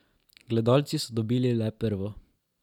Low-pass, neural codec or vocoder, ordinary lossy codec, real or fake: 19.8 kHz; none; none; real